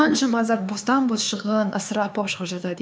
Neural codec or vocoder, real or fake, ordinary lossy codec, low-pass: codec, 16 kHz, 4 kbps, X-Codec, HuBERT features, trained on LibriSpeech; fake; none; none